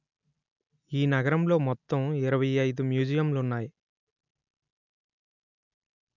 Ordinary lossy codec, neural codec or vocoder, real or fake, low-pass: none; none; real; 7.2 kHz